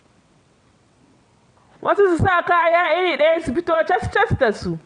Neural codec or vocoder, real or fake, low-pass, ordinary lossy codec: vocoder, 22.05 kHz, 80 mel bands, WaveNeXt; fake; 9.9 kHz; none